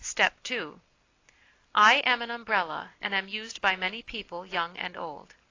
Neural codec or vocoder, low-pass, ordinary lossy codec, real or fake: none; 7.2 kHz; AAC, 32 kbps; real